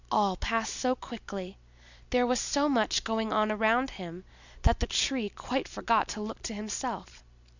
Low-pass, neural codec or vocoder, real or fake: 7.2 kHz; none; real